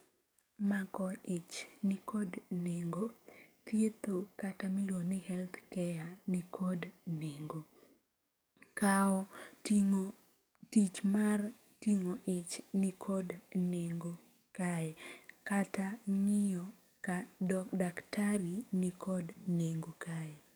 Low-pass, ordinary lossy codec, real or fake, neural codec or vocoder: none; none; fake; codec, 44.1 kHz, 7.8 kbps, DAC